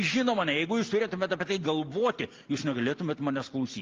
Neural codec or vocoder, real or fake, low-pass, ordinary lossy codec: none; real; 7.2 kHz; Opus, 32 kbps